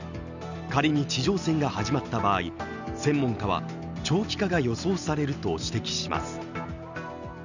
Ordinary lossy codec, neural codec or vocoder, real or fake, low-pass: none; none; real; 7.2 kHz